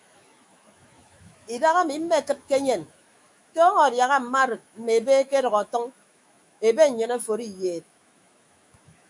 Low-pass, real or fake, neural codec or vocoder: 10.8 kHz; fake; autoencoder, 48 kHz, 128 numbers a frame, DAC-VAE, trained on Japanese speech